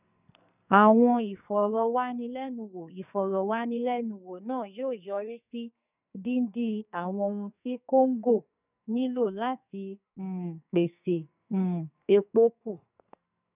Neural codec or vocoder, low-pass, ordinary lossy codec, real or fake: codec, 44.1 kHz, 2.6 kbps, SNAC; 3.6 kHz; none; fake